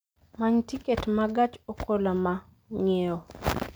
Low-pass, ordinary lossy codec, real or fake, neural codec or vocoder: none; none; real; none